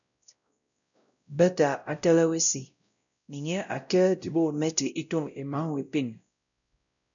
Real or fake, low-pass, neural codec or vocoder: fake; 7.2 kHz; codec, 16 kHz, 0.5 kbps, X-Codec, WavLM features, trained on Multilingual LibriSpeech